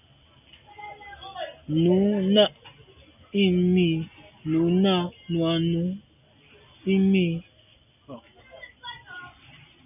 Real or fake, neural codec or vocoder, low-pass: real; none; 3.6 kHz